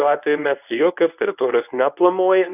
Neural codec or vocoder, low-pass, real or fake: codec, 24 kHz, 0.9 kbps, WavTokenizer, medium speech release version 1; 3.6 kHz; fake